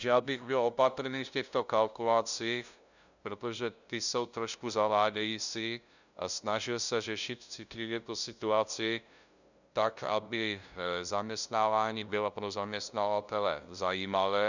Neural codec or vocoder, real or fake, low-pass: codec, 16 kHz, 0.5 kbps, FunCodec, trained on LibriTTS, 25 frames a second; fake; 7.2 kHz